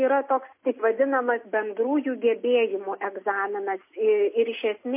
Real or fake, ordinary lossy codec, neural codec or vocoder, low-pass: real; MP3, 24 kbps; none; 3.6 kHz